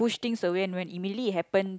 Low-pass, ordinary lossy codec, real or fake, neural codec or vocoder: none; none; real; none